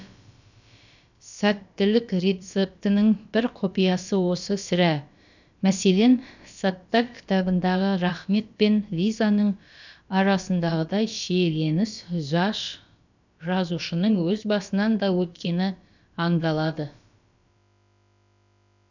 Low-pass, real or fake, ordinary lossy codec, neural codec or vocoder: 7.2 kHz; fake; none; codec, 16 kHz, about 1 kbps, DyCAST, with the encoder's durations